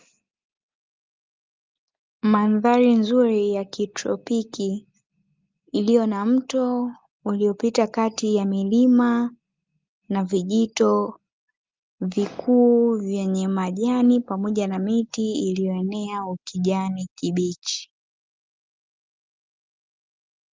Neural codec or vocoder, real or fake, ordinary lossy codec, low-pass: none; real; Opus, 32 kbps; 7.2 kHz